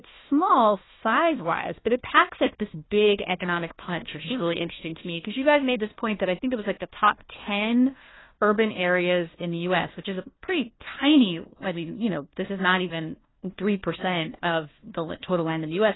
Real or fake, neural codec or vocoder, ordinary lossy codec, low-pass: fake; codec, 16 kHz, 1 kbps, FunCodec, trained on Chinese and English, 50 frames a second; AAC, 16 kbps; 7.2 kHz